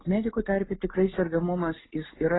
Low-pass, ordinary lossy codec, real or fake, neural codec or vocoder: 7.2 kHz; AAC, 16 kbps; real; none